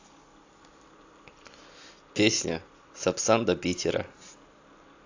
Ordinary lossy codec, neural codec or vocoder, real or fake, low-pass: AAC, 48 kbps; none; real; 7.2 kHz